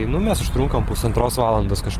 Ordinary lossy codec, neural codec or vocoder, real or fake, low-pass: Opus, 16 kbps; none; real; 14.4 kHz